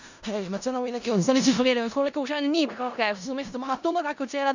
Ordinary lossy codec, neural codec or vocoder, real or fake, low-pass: none; codec, 16 kHz in and 24 kHz out, 0.4 kbps, LongCat-Audio-Codec, four codebook decoder; fake; 7.2 kHz